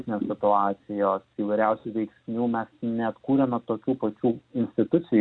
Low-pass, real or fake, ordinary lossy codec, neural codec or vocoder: 9.9 kHz; real; Opus, 64 kbps; none